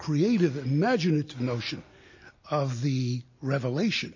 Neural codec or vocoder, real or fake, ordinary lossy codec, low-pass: none; real; MP3, 32 kbps; 7.2 kHz